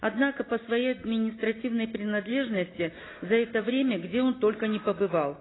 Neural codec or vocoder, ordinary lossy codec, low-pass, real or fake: none; AAC, 16 kbps; 7.2 kHz; real